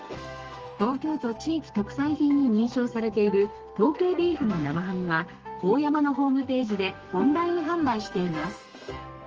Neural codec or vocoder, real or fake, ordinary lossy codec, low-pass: codec, 32 kHz, 1.9 kbps, SNAC; fake; Opus, 24 kbps; 7.2 kHz